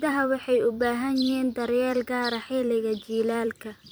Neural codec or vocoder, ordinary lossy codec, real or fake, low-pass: vocoder, 44.1 kHz, 128 mel bands every 256 samples, BigVGAN v2; none; fake; none